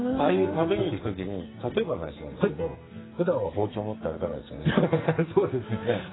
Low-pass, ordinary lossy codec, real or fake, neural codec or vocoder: 7.2 kHz; AAC, 16 kbps; fake; codec, 44.1 kHz, 2.6 kbps, SNAC